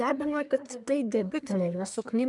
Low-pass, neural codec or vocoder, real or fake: 10.8 kHz; codec, 24 kHz, 1 kbps, SNAC; fake